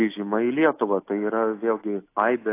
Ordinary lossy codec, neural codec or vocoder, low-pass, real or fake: AAC, 24 kbps; none; 3.6 kHz; real